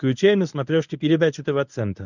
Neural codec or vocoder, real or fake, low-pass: codec, 24 kHz, 0.9 kbps, WavTokenizer, medium speech release version 1; fake; 7.2 kHz